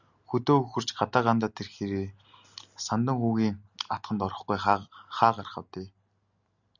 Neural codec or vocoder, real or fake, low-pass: none; real; 7.2 kHz